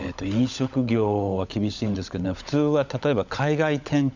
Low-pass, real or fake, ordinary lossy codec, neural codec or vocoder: 7.2 kHz; fake; none; codec, 16 kHz, 16 kbps, FunCodec, trained on LibriTTS, 50 frames a second